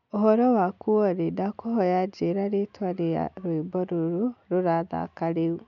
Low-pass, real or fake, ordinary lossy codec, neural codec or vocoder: 7.2 kHz; real; none; none